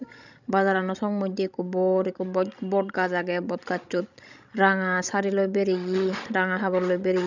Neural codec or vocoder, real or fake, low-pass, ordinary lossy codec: codec, 16 kHz, 16 kbps, FreqCodec, larger model; fake; 7.2 kHz; none